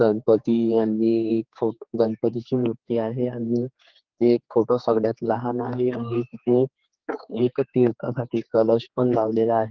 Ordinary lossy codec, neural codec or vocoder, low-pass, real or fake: Opus, 16 kbps; codec, 16 kHz, 4 kbps, X-Codec, HuBERT features, trained on general audio; 7.2 kHz; fake